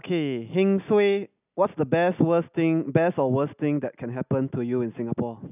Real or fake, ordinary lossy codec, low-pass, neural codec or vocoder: real; none; 3.6 kHz; none